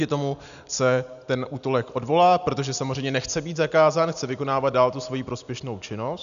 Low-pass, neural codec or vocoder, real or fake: 7.2 kHz; none; real